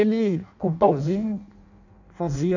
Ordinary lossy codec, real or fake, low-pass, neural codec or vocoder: none; fake; 7.2 kHz; codec, 16 kHz in and 24 kHz out, 0.6 kbps, FireRedTTS-2 codec